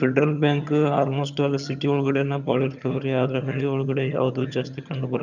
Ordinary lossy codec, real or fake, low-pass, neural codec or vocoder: none; fake; 7.2 kHz; vocoder, 22.05 kHz, 80 mel bands, HiFi-GAN